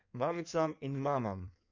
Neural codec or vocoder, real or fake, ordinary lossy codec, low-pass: codec, 16 kHz in and 24 kHz out, 1.1 kbps, FireRedTTS-2 codec; fake; none; 7.2 kHz